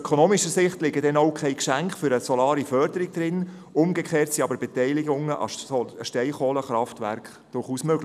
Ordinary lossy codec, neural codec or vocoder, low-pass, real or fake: none; none; 14.4 kHz; real